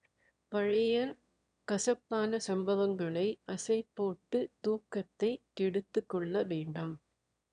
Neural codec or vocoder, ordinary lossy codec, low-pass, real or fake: autoencoder, 22.05 kHz, a latent of 192 numbers a frame, VITS, trained on one speaker; none; 9.9 kHz; fake